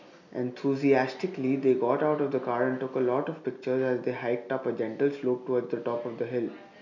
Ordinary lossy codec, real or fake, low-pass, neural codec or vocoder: none; real; 7.2 kHz; none